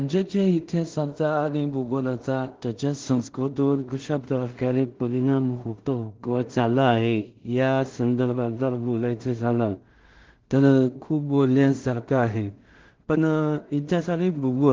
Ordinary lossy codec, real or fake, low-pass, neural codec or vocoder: Opus, 16 kbps; fake; 7.2 kHz; codec, 16 kHz in and 24 kHz out, 0.4 kbps, LongCat-Audio-Codec, two codebook decoder